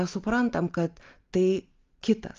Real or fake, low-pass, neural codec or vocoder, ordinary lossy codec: real; 7.2 kHz; none; Opus, 32 kbps